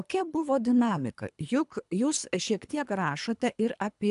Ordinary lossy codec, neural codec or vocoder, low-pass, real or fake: AAC, 96 kbps; codec, 24 kHz, 3 kbps, HILCodec; 10.8 kHz; fake